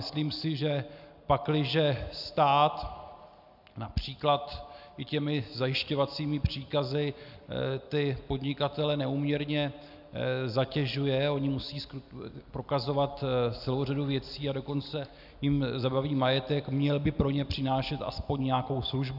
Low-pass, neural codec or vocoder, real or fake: 5.4 kHz; none; real